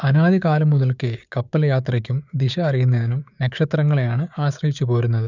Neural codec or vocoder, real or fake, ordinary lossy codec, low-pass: codec, 16 kHz, 6 kbps, DAC; fake; none; 7.2 kHz